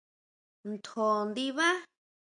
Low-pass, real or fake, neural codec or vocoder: 9.9 kHz; real; none